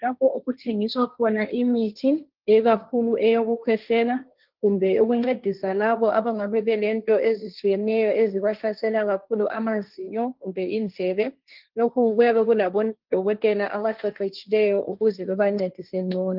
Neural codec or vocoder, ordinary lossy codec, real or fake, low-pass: codec, 16 kHz, 1.1 kbps, Voila-Tokenizer; Opus, 24 kbps; fake; 5.4 kHz